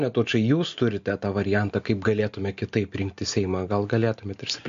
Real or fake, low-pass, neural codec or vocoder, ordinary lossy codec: real; 7.2 kHz; none; MP3, 48 kbps